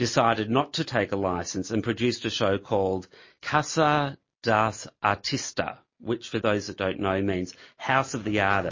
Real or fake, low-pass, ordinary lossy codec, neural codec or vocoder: real; 7.2 kHz; MP3, 32 kbps; none